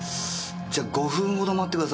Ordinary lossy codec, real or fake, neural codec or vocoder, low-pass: none; real; none; none